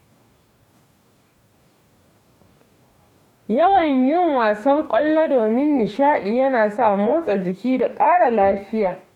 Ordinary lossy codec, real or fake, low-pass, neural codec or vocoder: none; fake; 19.8 kHz; codec, 44.1 kHz, 2.6 kbps, DAC